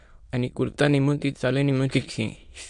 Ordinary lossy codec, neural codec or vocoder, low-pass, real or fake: MP3, 64 kbps; autoencoder, 22.05 kHz, a latent of 192 numbers a frame, VITS, trained on many speakers; 9.9 kHz; fake